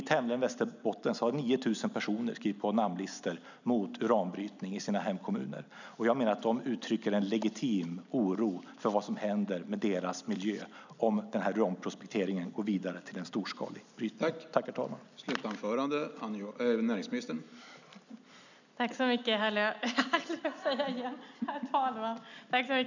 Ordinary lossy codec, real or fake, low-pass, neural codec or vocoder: none; real; 7.2 kHz; none